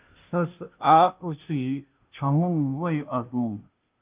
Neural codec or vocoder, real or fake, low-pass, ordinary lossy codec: codec, 16 kHz, 0.5 kbps, FunCodec, trained on Chinese and English, 25 frames a second; fake; 3.6 kHz; Opus, 24 kbps